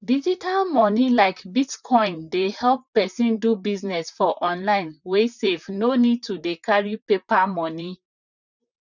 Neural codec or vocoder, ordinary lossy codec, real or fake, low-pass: vocoder, 44.1 kHz, 128 mel bands, Pupu-Vocoder; none; fake; 7.2 kHz